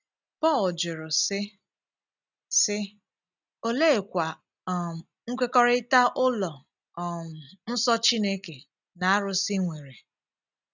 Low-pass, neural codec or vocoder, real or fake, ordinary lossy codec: 7.2 kHz; none; real; none